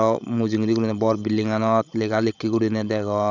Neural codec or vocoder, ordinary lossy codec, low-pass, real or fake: codec, 16 kHz, 16 kbps, FreqCodec, larger model; none; 7.2 kHz; fake